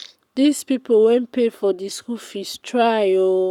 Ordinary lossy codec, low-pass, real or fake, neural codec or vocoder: none; 19.8 kHz; fake; codec, 44.1 kHz, 7.8 kbps, Pupu-Codec